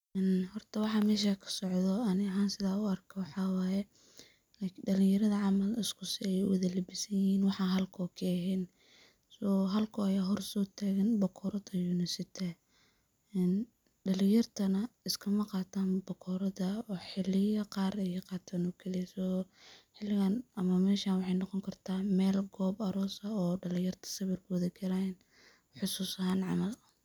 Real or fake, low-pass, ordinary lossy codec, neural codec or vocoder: real; 19.8 kHz; none; none